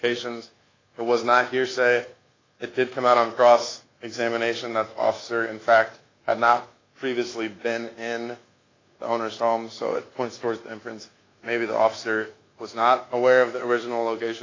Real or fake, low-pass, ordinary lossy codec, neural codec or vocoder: fake; 7.2 kHz; AAC, 32 kbps; codec, 24 kHz, 1.2 kbps, DualCodec